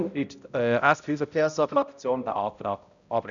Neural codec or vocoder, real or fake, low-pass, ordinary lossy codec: codec, 16 kHz, 0.5 kbps, X-Codec, HuBERT features, trained on balanced general audio; fake; 7.2 kHz; none